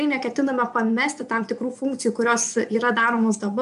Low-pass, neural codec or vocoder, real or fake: 10.8 kHz; vocoder, 24 kHz, 100 mel bands, Vocos; fake